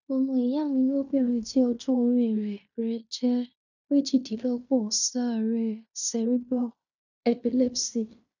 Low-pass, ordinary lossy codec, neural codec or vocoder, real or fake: 7.2 kHz; none; codec, 16 kHz in and 24 kHz out, 0.9 kbps, LongCat-Audio-Codec, fine tuned four codebook decoder; fake